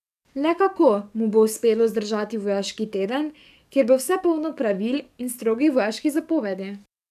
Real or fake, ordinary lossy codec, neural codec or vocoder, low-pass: fake; none; codec, 44.1 kHz, 7.8 kbps, DAC; 14.4 kHz